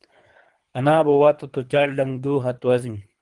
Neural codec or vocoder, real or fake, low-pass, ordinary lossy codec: codec, 24 kHz, 3 kbps, HILCodec; fake; 10.8 kHz; Opus, 24 kbps